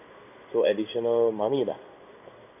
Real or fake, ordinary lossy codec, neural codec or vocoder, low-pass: fake; none; codec, 16 kHz in and 24 kHz out, 1 kbps, XY-Tokenizer; 3.6 kHz